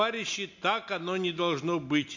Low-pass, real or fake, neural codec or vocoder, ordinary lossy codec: 7.2 kHz; real; none; MP3, 48 kbps